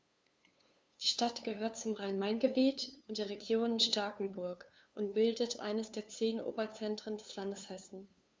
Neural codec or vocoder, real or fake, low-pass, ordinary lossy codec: codec, 16 kHz, 2 kbps, FunCodec, trained on LibriTTS, 25 frames a second; fake; none; none